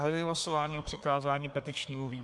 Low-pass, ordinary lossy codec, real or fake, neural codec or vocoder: 10.8 kHz; MP3, 96 kbps; fake; codec, 24 kHz, 1 kbps, SNAC